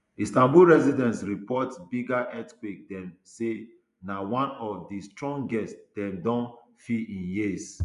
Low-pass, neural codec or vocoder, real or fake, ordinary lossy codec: 10.8 kHz; vocoder, 24 kHz, 100 mel bands, Vocos; fake; none